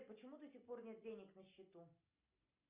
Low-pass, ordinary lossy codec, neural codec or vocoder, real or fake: 3.6 kHz; Opus, 64 kbps; none; real